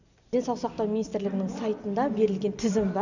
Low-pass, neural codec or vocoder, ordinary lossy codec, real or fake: 7.2 kHz; none; none; real